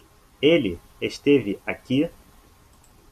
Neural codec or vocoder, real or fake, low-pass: none; real; 14.4 kHz